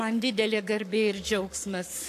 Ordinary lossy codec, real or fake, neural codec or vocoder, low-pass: AAC, 64 kbps; fake; codec, 44.1 kHz, 7.8 kbps, Pupu-Codec; 14.4 kHz